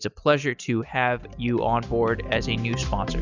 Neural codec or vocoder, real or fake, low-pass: none; real; 7.2 kHz